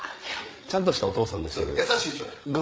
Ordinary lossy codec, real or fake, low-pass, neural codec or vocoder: none; fake; none; codec, 16 kHz, 8 kbps, FreqCodec, larger model